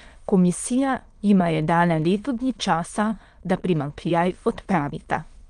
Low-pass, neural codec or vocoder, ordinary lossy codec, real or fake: 9.9 kHz; autoencoder, 22.05 kHz, a latent of 192 numbers a frame, VITS, trained on many speakers; Opus, 24 kbps; fake